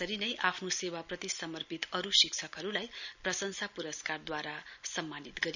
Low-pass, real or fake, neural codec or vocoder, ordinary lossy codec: 7.2 kHz; real; none; none